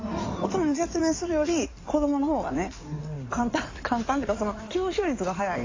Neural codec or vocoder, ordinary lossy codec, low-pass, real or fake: codec, 16 kHz in and 24 kHz out, 2.2 kbps, FireRedTTS-2 codec; AAC, 32 kbps; 7.2 kHz; fake